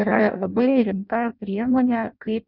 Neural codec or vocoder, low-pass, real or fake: codec, 16 kHz in and 24 kHz out, 0.6 kbps, FireRedTTS-2 codec; 5.4 kHz; fake